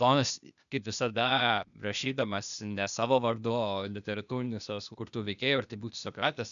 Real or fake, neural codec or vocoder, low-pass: fake; codec, 16 kHz, 0.8 kbps, ZipCodec; 7.2 kHz